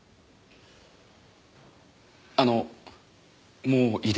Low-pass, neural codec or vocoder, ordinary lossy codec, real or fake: none; none; none; real